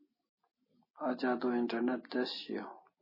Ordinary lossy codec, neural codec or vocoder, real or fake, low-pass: MP3, 24 kbps; none; real; 5.4 kHz